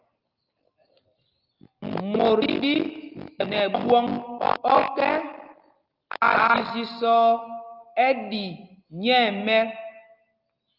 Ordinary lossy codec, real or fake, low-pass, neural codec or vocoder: Opus, 24 kbps; real; 5.4 kHz; none